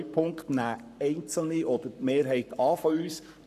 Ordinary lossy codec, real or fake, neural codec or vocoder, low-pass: none; fake; codec, 44.1 kHz, 7.8 kbps, Pupu-Codec; 14.4 kHz